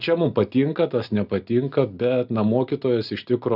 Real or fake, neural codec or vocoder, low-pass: real; none; 5.4 kHz